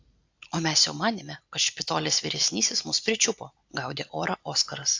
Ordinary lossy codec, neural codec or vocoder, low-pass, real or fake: AAC, 48 kbps; none; 7.2 kHz; real